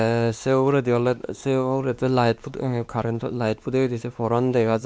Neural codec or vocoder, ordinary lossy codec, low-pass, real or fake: codec, 16 kHz, 2 kbps, X-Codec, WavLM features, trained on Multilingual LibriSpeech; none; none; fake